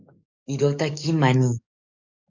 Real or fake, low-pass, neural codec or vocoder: fake; 7.2 kHz; codec, 44.1 kHz, 7.8 kbps, DAC